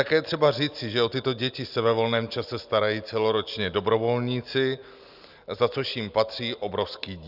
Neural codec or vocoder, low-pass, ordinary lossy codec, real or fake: none; 5.4 kHz; Opus, 64 kbps; real